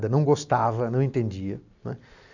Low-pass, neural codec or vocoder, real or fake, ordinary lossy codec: 7.2 kHz; none; real; none